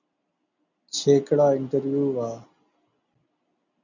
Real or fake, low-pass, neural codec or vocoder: real; 7.2 kHz; none